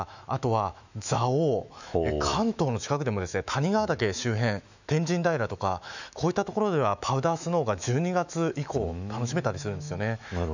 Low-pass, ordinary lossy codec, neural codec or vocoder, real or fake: 7.2 kHz; none; autoencoder, 48 kHz, 128 numbers a frame, DAC-VAE, trained on Japanese speech; fake